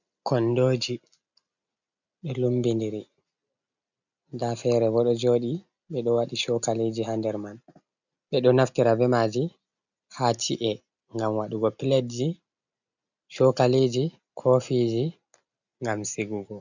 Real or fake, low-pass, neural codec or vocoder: real; 7.2 kHz; none